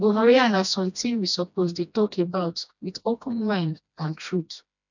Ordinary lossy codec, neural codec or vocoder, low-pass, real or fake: none; codec, 16 kHz, 1 kbps, FreqCodec, smaller model; 7.2 kHz; fake